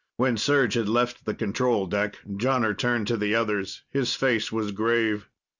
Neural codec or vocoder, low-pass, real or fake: none; 7.2 kHz; real